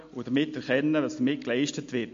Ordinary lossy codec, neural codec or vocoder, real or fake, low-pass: MP3, 48 kbps; none; real; 7.2 kHz